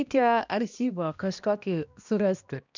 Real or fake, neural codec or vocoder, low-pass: fake; codec, 16 kHz, 1 kbps, X-Codec, HuBERT features, trained on balanced general audio; 7.2 kHz